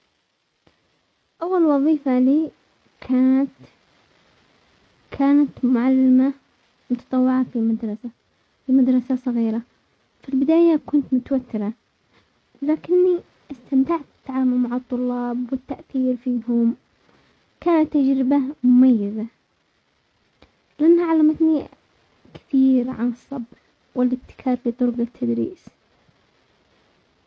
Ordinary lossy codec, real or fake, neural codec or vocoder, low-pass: none; real; none; none